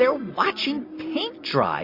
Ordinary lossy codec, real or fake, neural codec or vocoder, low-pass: MP3, 32 kbps; real; none; 5.4 kHz